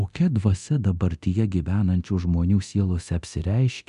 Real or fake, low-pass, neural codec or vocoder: fake; 10.8 kHz; codec, 24 kHz, 0.9 kbps, DualCodec